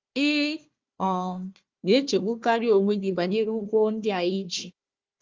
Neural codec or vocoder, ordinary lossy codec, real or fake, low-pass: codec, 16 kHz, 1 kbps, FunCodec, trained on Chinese and English, 50 frames a second; Opus, 32 kbps; fake; 7.2 kHz